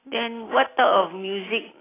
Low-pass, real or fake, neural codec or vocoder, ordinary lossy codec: 3.6 kHz; real; none; AAC, 16 kbps